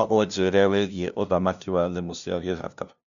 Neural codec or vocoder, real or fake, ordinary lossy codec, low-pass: codec, 16 kHz, 0.5 kbps, FunCodec, trained on LibriTTS, 25 frames a second; fake; none; 7.2 kHz